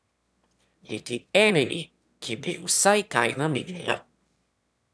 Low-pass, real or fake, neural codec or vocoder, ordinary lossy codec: none; fake; autoencoder, 22.05 kHz, a latent of 192 numbers a frame, VITS, trained on one speaker; none